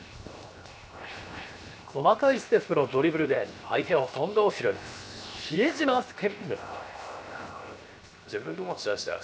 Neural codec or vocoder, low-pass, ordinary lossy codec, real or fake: codec, 16 kHz, 0.7 kbps, FocalCodec; none; none; fake